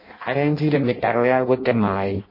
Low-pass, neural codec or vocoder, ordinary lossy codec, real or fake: 5.4 kHz; codec, 16 kHz in and 24 kHz out, 0.6 kbps, FireRedTTS-2 codec; MP3, 24 kbps; fake